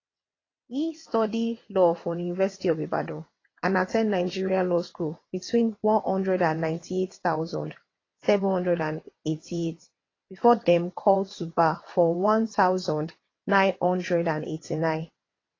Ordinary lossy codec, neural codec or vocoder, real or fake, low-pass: AAC, 32 kbps; vocoder, 22.05 kHz, 80 mel bands, WaveNeXt; fake; 7.2 kHz